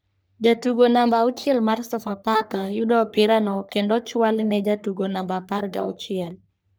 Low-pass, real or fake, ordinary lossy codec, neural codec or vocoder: none; fake; none; codec, 44.1 kHz, 3.4 kbps, Pupu-Codec